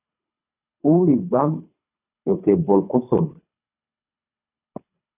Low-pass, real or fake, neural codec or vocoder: 3.6 kHz; fake; codec, 24 kHz, 3 kbps, HILCodec